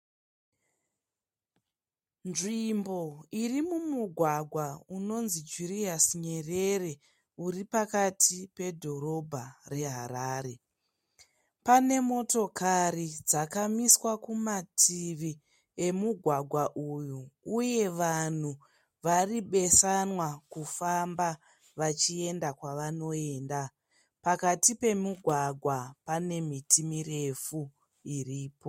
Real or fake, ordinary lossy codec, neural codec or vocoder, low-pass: real; MP3, 64 kbps; none; 19.8 kHz